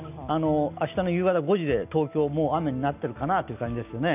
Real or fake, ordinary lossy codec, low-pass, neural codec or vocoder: real; none; 3.6 kHz; none